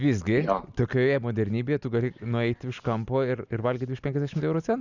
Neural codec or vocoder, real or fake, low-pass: none; real; 7.2 kHz